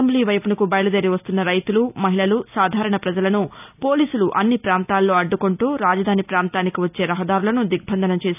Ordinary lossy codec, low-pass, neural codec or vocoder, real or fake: none; 3.6 kHz; none; real